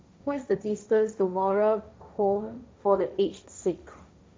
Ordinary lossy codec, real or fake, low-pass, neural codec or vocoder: none; fake; none; codec, 16 kHz, 1.1 kbps, Voila-Tokenizer